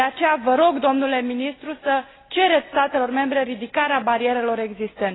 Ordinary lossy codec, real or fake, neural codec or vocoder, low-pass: AAC, 16 kbps; real; none; 7.2 kHz